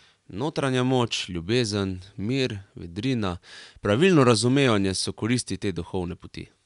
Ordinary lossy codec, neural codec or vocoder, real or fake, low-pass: none; none; real; 10.8 kHz